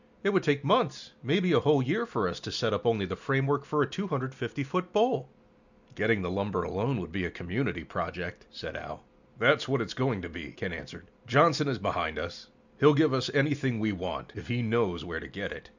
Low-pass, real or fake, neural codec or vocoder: 7.2 kHz; real; none